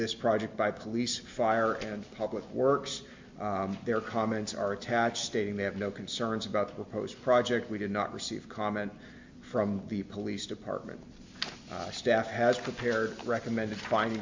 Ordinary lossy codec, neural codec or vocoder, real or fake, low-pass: MP3, 64 kbps; none; real; 7.2 kHz